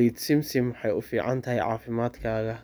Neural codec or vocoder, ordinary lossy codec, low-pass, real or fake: none; none; none; real